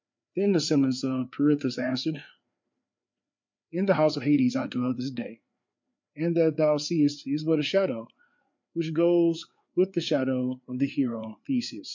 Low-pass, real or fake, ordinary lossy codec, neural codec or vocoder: 7.2 kHz; fake; MP3, 64 kbps; codec, 16 kHz, 4 kbps, FreqCodec, larger model